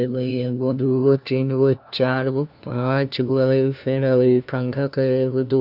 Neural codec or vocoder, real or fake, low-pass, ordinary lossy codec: codec, 16 kHz, 1 kbps, FunCodec, trained on LibriTTS, 50 frames a second; fake; 5.4 kHz; none